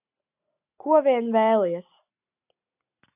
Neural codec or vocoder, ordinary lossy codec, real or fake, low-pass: none; AAC, 24 kbps; real; 3.6 kHz